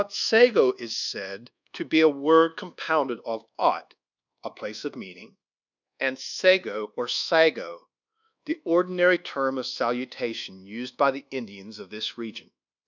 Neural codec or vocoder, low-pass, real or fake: codec, 24 kHz, 1.2 kbps, DualCodec; 7.2 kHz; fake